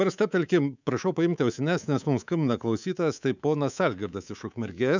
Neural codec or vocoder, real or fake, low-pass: codec, 24 kHz, 3.1 kbps, DualCodec; fake; 7.2 kHz